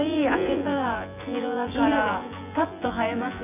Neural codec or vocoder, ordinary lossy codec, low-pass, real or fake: vocoder, 24 kHz, 100 mel bands, Vocos; none; 3.6 kHz; fake